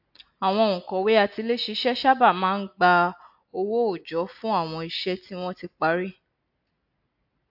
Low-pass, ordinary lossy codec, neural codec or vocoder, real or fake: 5.4 kHz; AAC, 48 kbps; none; real